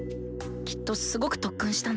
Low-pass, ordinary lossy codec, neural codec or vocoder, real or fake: none; none; none; real